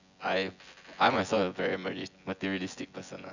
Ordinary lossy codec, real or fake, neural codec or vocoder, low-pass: none; fake; vocoder, 24 kHz, 100 mel bands, Vocos; 7.2 kHz